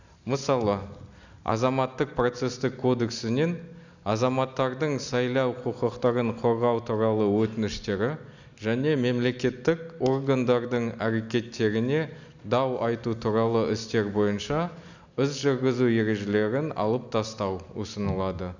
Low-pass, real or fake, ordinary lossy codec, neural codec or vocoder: 7.2 kHz; real; none; none